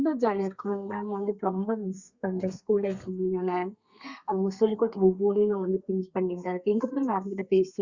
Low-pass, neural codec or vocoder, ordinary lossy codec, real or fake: 7.2 kHz; codec, 32 kHz, 1.9 kbps, SNAC; Opus, 64 kbps; fake